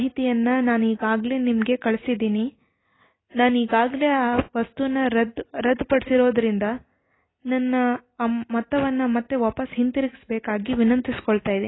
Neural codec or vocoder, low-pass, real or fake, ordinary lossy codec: none; 7.2 kHz; real; AAC, 16 kbps